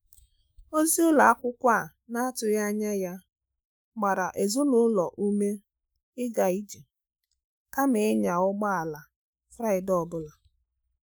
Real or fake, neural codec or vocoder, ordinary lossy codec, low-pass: fake; autoencoder, 48 kHz, 128 numbers a frame, DAC-VAE, trained on Japanese speech; none; none